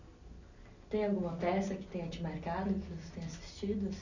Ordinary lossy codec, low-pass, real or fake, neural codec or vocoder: none; 7.2 kHz; real; none